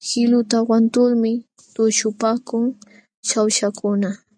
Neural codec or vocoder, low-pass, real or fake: none; 9.9 kHz; real